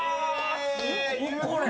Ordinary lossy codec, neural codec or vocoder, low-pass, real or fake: none; none; none; real